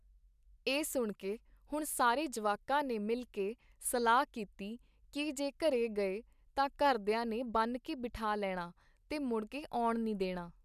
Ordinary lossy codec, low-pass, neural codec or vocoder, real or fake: none; 14.4 kHz; none; real